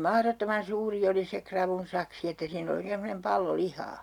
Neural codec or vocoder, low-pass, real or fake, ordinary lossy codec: none; 19.8 kHz; real; none